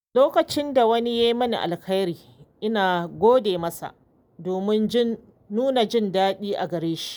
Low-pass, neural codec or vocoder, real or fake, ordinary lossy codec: none; none; real; none